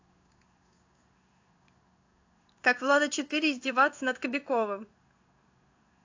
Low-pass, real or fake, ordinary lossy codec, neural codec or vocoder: 7.2 kHz; fake; AAC, 48 kbps; codec, 16 kHz in and 24 kHz out, 1 kbps, XY-Tokenizer